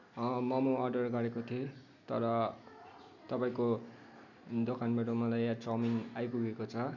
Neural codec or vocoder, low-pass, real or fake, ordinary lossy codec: none; 7.2 kHz; real; none